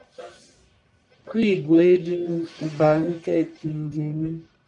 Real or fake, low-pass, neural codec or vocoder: fake; 10.8 kHz; codec, 44.1 kHz, 1.7 kbps, Pupu-Codec